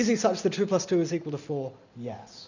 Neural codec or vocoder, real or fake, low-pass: none; real; 7.2 kHz